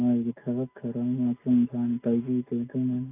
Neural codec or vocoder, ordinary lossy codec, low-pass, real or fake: none; AAC, 32 kbps; 3.6 kHz; real